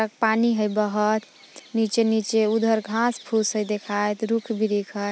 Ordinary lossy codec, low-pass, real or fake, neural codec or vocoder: none; none; real; none